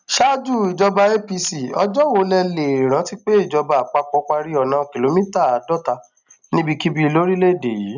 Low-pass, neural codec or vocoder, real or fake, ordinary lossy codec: 7.2 kHz; none; real; none